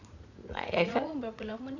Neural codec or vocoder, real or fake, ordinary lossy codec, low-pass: vocoder, 44.1 kHz, 80 mel bands, Vocos; fake; none; 7.2 kHz